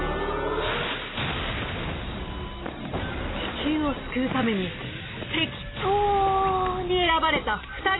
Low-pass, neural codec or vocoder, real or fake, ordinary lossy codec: 7.2 kHz; none; real; AAC, 16 kbps